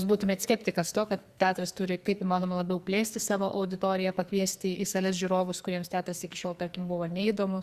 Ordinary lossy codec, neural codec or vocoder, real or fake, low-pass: Opus, 64 kbps; codec, 44.1 kHz, 2.6 kbps, SNAC; fake; 14.4 kHz